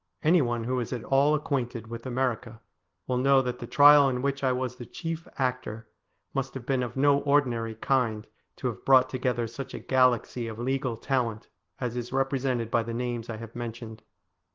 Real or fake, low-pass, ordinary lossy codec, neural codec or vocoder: real; 7.2 kHz; Opus, 16 kbps; none